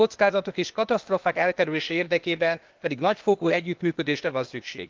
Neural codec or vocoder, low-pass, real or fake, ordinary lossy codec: codec, 16 kHz, 0.8 kbps, ZipCodec; 7.2 kHz; fake; Opus, 32 kbps